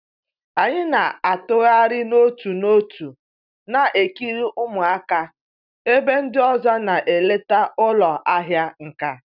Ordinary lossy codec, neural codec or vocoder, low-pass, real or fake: none; none; 5.4 kHz; real